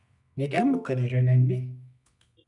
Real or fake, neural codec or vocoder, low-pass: fake; codec, 24 kHz, 0.9 kbps, WavTokenizer, medium music audio release; 10.8 kHz